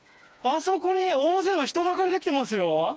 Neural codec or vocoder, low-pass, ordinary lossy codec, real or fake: codec, 16 kHz, 2 kbps, FreqCodec, smaller model; none; none; fake